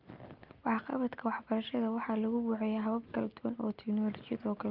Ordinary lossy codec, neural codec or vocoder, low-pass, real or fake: Opus, 32 kbps; none; 5.4 kHz; real